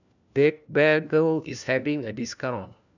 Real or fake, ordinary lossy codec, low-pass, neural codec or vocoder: fake; none; 7.2 kHz; codec, 16 kHz, 1 kbps, FunCodec, trained on LibriTTS, 50 frames a second